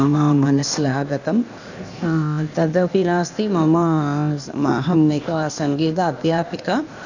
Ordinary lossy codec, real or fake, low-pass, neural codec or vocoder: none; fake; 7.2 kHz; codec, 16 kHz, 0.8 kbps, ZipCodec